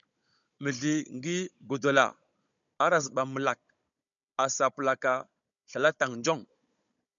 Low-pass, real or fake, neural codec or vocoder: 7.2 kHz; fake; codec, 16 kHz, 16 kbps, FunCodec, trained on Chinese and English, 50 frames a second